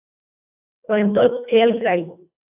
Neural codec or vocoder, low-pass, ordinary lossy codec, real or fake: codec, 24 kHz, 1.5 kbps, HILCodec; 3.6 kHz; AAC, 24 kbps; fake